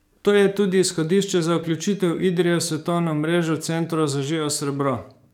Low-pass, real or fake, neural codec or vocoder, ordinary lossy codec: 19.8 kHz; fake; codec, 44.1 kHz, 7.8 kbps, DAC; none